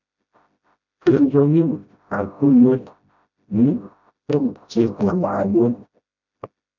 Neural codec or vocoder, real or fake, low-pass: codec, 16 kHz, 0.5 kbps, FreqCodec, smaller model; fake; 7.2 kHz